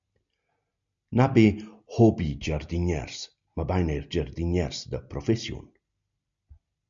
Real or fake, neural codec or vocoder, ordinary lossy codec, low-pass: real; none; MP3, 64 kbps; 7.2 kHz